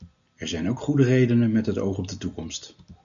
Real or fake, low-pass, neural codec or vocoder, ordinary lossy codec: real; 7.2 kHz; none; MP3, 96 kbps